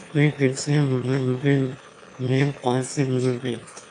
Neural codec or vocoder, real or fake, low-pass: autoencoder, 22.05 kHz, a latent of 192 numbers a frame, VITS, trained on one speaker; fake; 9.9 kHz